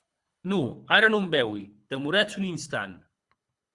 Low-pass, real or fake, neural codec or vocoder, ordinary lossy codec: 10.8 kHz; fake; codec, 24 kHz, 3 kbps, HILCodec; Opus, 64 kbps